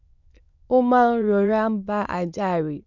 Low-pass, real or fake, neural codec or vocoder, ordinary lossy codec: 7.2 kHz; fake; autoencoder, 22.05 kHz, a latent of 192 numbers a frame, VITS, trained on many speakers; none